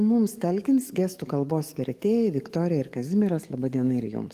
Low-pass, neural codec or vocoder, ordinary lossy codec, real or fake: 14.4 kHz; codec, 44.1 kHz, 7.8 kbps, DAC; Opus, 24 kbps; fake